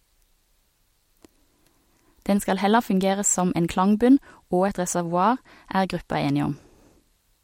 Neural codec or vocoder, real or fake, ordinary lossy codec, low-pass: none; real; MP3, 64 kbps; 19.8 kHz